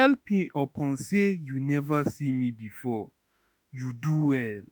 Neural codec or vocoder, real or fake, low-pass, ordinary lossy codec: autoencoder, 48 kHz, 32 numbers a frame, DAC-VAE, trained on Japanese speech; fake; none; none